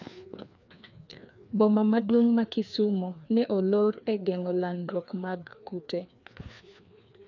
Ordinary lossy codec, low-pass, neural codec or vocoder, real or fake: none; 7.2 kHz; codec, 16 kHz, 2 kbps, FreqCodec, larger model; fake